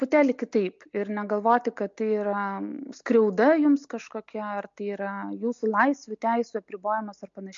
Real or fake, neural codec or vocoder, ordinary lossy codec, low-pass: real; none; MP3, 48 kbps; 7.2 kHz